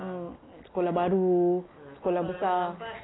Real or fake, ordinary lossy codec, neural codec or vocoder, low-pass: real; AAC, 16 kbps; none; 7.2 kHz